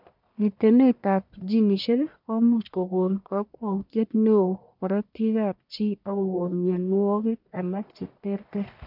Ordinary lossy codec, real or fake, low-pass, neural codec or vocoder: none; fake; 5.4 kHz; codec, 44.1 kHz, 1.7 kbps, Pupu-Codec